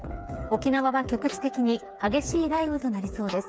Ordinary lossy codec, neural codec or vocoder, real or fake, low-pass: none; codec, 16 kHz, 4 kbps, FreqCodec, smaller model; fake; none